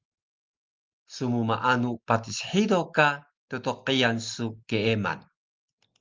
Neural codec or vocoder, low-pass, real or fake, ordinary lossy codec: none; 7.2 kHz; real; Opus, 24 kbps